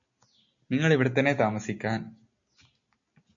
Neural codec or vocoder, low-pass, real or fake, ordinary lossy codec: none; 7.2 kHz; real; MP3, 48 kbps